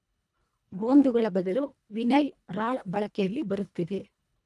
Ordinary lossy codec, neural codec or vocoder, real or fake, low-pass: none; codec, 24 kHz, 1.5 kbps, HILCodec; fake; none